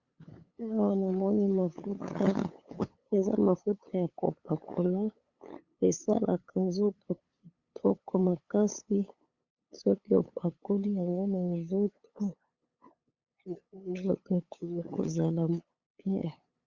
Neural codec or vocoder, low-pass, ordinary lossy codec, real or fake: codec, 16 kHz, 8 kbps, FunCodec, trained on LibriTTS, 25 frames a second; 7.2 kHz; Opus, 64 kbps; fake